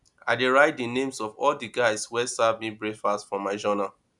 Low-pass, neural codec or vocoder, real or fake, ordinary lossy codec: 10.8 kHz; none; real; none